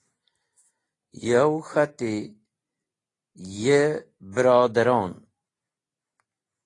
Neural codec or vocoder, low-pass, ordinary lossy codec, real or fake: none; 10.8 kHz; AAC, 32 kbps; real